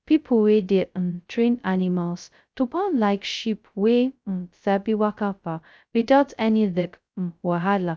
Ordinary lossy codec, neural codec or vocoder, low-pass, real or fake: none; codec, 16 kHz, 0.2 kbps, FocalCodec; none; fake